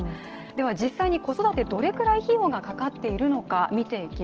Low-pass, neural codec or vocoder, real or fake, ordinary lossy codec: 7.2 kHz; none; real; Opus, 16 kbps